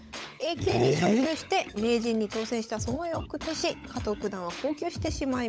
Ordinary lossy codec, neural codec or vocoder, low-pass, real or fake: none; codec, 16 kHz, 16 kbps, FunCodec, trained on LibriTTS, 50 frames a second; none; fake